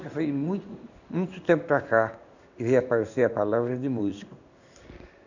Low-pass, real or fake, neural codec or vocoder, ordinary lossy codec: 7.2 kHz; fake; codec, 16 kHz in and 24 kHz out, 1 kbps, XY-Tokenizer; none